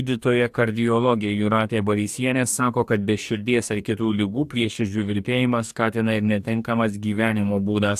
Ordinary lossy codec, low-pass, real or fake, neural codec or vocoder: AAC, 96 kbps; 14.4 kHz; fake; codec, 44.1 kHz, 2.6 kbps, DAC